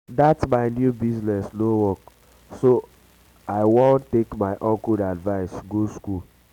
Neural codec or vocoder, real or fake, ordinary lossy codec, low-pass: none; real; none; 19.8 kHz